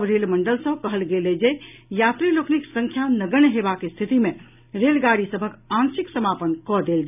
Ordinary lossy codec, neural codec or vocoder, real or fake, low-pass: none; none; real; 3.6 kHz